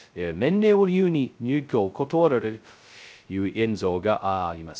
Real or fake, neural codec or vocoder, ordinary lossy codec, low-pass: fake; codec, 16 kHz, 0.2 kbps, FocalCodec; none; none